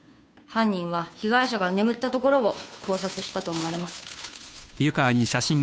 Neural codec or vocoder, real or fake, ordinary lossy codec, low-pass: codec, 16 kHz, 2 kbps, FunCodec, trained on Chinese and English, 25 frames a second; fake; none; none